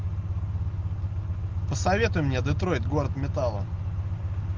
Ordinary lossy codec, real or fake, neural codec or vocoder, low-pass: Opus, 32 kbps; real; none; 7.2 kHz